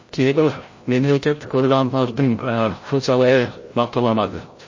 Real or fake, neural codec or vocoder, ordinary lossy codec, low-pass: fake; codec, 16 kHz, 0.5 kbps, FreqCodec, larger model; MP3, 32 kbps; 7.2 kHz